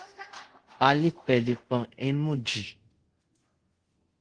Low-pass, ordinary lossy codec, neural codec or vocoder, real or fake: 9.9 kHz; Opus, 16 kbps; codec, 24 kHz, 0.5 kbps, DualCodec; fake